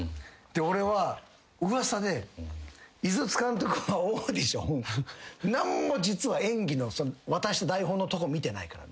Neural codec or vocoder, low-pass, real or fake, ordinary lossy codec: none; none; real; none